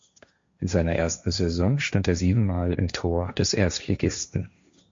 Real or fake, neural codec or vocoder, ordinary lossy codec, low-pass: fake; codec, 16 kHz, 1.1 kbps, Voila-Tokenizer; MP3, 48 kbps; 7.2 kHz